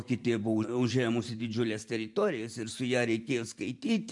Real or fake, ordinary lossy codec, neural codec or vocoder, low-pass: fake; MP3, 64 kbps; vocoder, 44.1 kHz, 128 mel bands every 512 samples, BigVGAN v2; 10.8 kHz